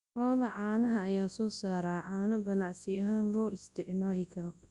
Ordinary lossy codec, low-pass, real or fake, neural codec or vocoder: Opus, 64 kbps; 10.8 kHz; fake; codec, 24 kHz, 0.9 kbps, WavTokenizer, large speech release